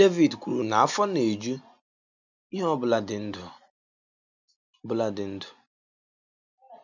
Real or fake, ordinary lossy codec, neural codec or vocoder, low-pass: real; none; none; 7.2 kHz